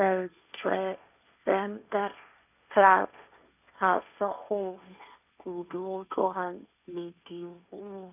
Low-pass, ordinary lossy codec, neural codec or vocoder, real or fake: 3.6 kHz; none; codec, 16 kHz, 1.1 kbps, Voila-Tokenizer; fake